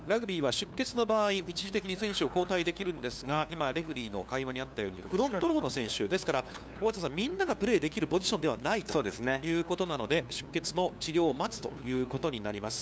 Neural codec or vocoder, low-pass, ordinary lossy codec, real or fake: codec, 16 kHz, 2 kbps, FunCodec, trained on LibriTTS, 25 frames a second; none; none; fake